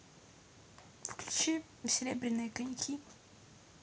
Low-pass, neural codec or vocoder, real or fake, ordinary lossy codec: none; none; real; none